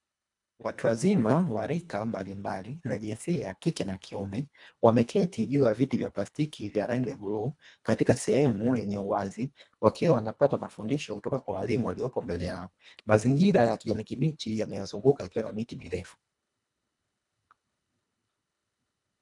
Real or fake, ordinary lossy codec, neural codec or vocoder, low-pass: fake; MP3, 96 kbps; codec, 24 kHz, 1.5 kbps, HILCodec; 10.8 kHz